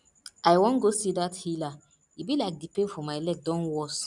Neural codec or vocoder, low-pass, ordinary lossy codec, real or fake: none; 10.8 kHz; none; real